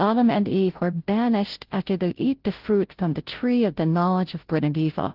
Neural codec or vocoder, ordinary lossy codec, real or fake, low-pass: codec, 16 kHz, 0.5 kbps, FunCodec, trained on Chinese and English, 25 frames a second; Opus, 16 kbps; fake; 5.4 kHz